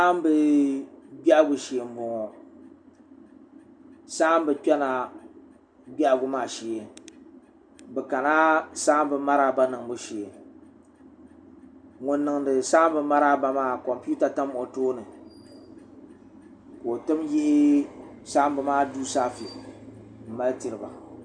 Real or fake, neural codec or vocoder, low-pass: real; none; 9.9 kHz